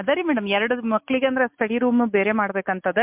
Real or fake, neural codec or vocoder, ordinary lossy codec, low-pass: real; none; MP3, 32 kbps; 3.6 kHz